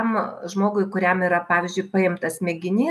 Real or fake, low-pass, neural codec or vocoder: real; 14.4 kHz; none